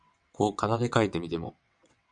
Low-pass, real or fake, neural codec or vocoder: 9.9 kHz; fake; vocoder, 22.05 kHz, 80 mel bands, WaveNeXt